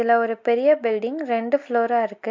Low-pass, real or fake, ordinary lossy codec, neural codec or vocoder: 7.2 kHz; real; MP3, 48 kbps; none